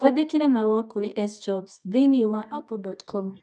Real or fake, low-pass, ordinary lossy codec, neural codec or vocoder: fake; none; none; codec, 24 kHz, 0.9 kbps, WavTokenizer, medium music audio release